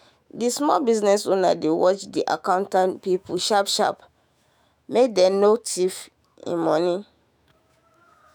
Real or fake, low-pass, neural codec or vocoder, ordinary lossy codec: fake; none; autoencoder, 48 kHz, 128 numbers a frame, DAC-VAE, trained on Japanese speech; none